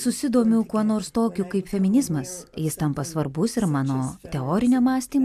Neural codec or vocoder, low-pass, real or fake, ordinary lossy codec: none; 14.4 kHz; real; MP3, 96 kbps